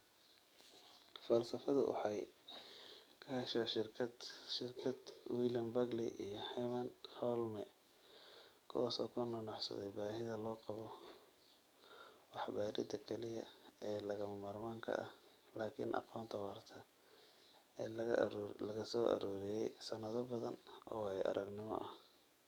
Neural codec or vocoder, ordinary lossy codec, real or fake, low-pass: codec, 44.1 kHz, 7.8 kbps, DAC; none; fake; none